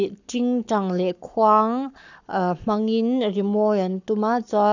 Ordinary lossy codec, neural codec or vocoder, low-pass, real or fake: none; codec, 44.1 kHz, 7.8 kbps, Pupu-Codec; 7.2 kHz; fake